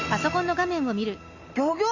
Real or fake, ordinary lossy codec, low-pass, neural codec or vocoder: real; none; 7.2 kHz; none